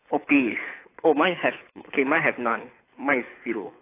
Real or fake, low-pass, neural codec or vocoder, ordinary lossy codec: fake; 3.6 kHz; codec, 24 kHz, 6 kbps, HILCodec; AAC, 24 kbps